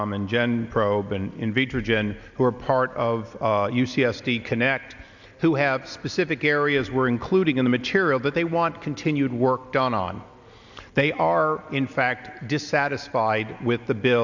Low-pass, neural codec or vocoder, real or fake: 7.2 kHz; none; real